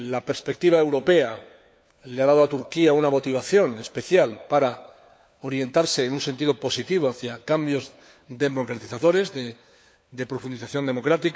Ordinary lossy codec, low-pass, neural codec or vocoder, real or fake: none; none; codec, 16 kHz, 4 kbps, FunCodec, trained on LibriTTS, 50 frames a second; fake